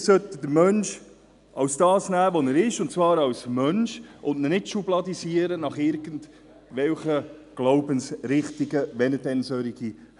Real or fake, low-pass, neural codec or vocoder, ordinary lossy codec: real; 10.8 kHz; none; none